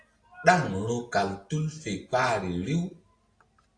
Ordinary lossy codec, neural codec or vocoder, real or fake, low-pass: AAC, 48 kbps; none; real; 9.9 kHz